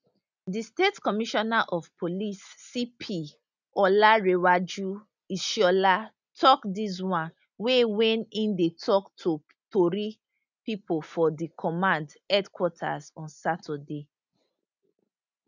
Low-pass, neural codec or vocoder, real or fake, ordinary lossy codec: 7.2 kHz; none; real; none